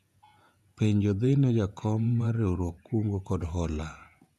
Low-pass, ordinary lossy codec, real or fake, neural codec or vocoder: 14.4 kHz; none; fake; vocoder, 48 kHz, 128 mel bands, Vocos